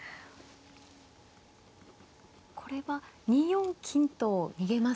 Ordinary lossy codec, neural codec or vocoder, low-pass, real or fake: none; none; none; real